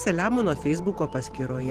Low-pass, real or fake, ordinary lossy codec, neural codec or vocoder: 14.4 kHz; fake; Opus, 16 kbps; vocoder, 44.1 kHz, 128 mel bands every 512 samples, BigVGAN v2